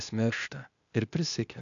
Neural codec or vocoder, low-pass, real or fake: codec, 16 kHz, 0.8 kbps, ZipCodec; 7.2 kHz; fake